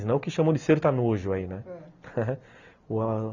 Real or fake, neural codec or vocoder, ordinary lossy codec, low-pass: real; none; none; 7.2 kHz